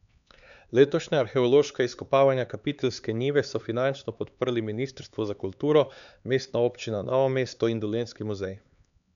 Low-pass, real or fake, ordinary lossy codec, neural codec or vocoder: 7.2 kHz; fake; none; codec, 16 kHz, 4 kbps, X-Codec, HuBERT features, trained on LibriSpeech